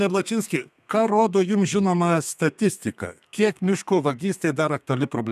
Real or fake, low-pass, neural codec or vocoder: fake; 14.4 kHz; codec, 32 kHz, 1.9 kbps, SNAC